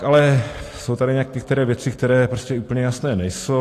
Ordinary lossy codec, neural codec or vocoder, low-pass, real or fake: AAC, 48 kbps; none; 14.4 kHz; real